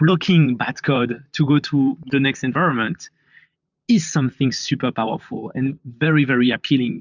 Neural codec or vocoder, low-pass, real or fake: vocoder, 44.1 kHz, 128 mel bands, Pupu-Vocoder; 7.2 kHz; fake